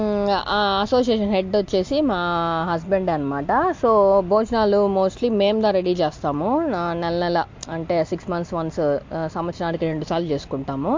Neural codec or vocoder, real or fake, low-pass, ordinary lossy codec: none; real; 7.2 kHz; MP3, 48 kbps